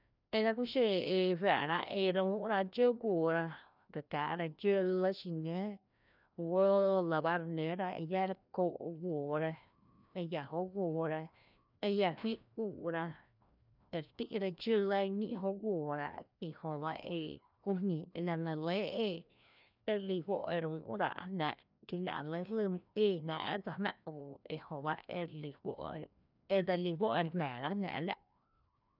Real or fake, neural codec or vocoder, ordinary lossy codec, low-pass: fake; codec, 16 kHz, 1 kbps, FreqCodec, larger model; none; 5.4 kHz